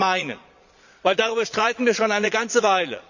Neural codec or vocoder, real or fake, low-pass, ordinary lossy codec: vocoder, 22.05 kHz, 80 mel bands, Vocos; fake; 7.2 kHz; none